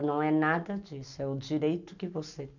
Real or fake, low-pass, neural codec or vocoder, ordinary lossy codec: real; 7.2 kHz; none; none